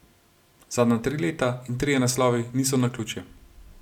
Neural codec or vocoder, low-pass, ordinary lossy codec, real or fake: none; 19.8 kHz; none; real